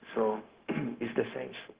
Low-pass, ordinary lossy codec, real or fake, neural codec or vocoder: 3.6 kHz; Opus, 16 kbps; fake; codec, 16 kHz, 0.4 kbps, LongCat-Audio-Codec